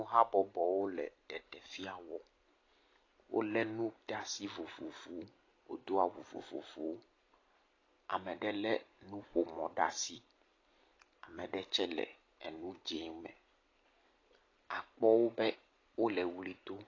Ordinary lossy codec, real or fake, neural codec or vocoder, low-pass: AAC, 32 kbps; real; none; 7.2 kHz